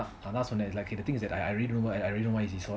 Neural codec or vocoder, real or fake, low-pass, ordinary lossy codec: none; real; none; none